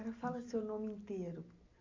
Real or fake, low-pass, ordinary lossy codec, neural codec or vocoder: real; 7.2 kHz; MP3, 48 kbps; none